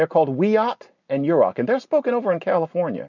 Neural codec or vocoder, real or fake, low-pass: none; real; 7.2 kHz